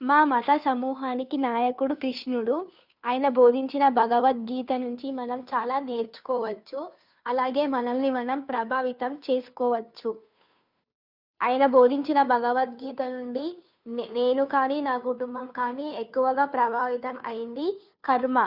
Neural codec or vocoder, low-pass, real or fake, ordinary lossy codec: codec, 16 kHz, 2 kbps, FunCodec, trained on Chinese and English, 25 frames a second; 5.4 kHz; fake; none